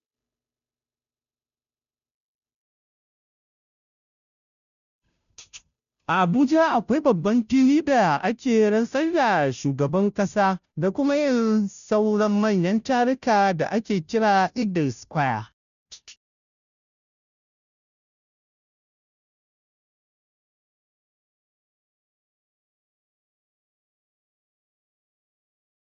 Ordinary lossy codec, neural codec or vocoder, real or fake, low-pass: none; codec, 16 kHz, 0.5 kbps, FunCodec, trained on Chinese and English, 25 frames a second; fake; 7.2 kHz